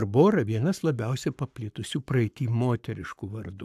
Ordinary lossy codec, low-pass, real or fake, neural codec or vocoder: AAC, 96 kbps; 14.4 kHz; fake; codec, 44.1 kHz, 7.8 kbps, Pupu-Codec